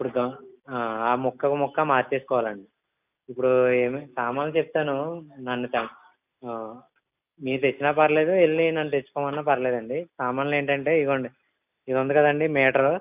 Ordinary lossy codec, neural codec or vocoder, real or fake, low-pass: none; none; real; 3.6 kHz